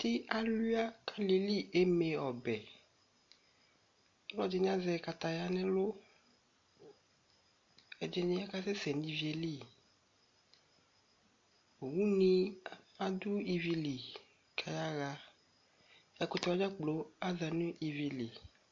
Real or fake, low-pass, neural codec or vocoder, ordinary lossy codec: real; 7.2 kHz; none; MP3, 48 kbps